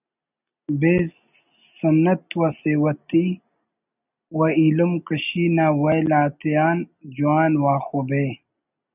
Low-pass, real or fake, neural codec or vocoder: 3.6 kHz; real; none